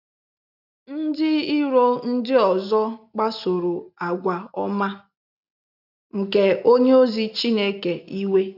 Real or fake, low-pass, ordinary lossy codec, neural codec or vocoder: real; 5.4 kHz; none; none